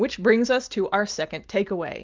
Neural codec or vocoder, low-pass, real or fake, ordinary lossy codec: none; 7.2 kHz; real; Opus, 24 kbps